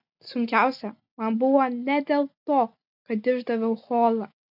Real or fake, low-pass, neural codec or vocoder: real; 5.4 kHz; none